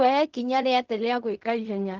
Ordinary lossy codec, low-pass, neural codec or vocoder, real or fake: Opus, 24 kbps; 7.2 kHz; codec, 16 kHz in and 24 kHz out, 0.4 kbps, LongCat-Audio-Codec, fine tuned four codebook decoder; fake